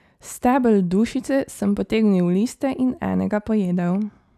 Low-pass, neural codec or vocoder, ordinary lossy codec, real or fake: 14.4 kHz; none; none; real